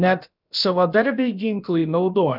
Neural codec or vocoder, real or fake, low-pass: codec, 16 kHz, about 1 kbps, DyCAST, with the encoder's durations; fake; 5.4 kHz